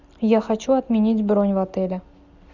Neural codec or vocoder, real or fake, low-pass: none; real; 7.2 kHz